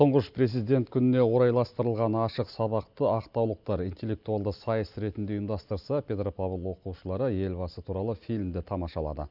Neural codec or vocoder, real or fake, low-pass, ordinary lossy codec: none; real; 5.4 kHz; none